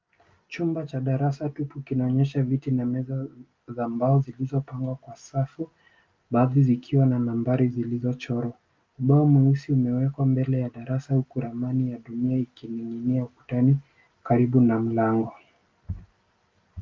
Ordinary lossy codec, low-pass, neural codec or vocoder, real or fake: Opus, 24 kbps; 7.2 kHz; none; real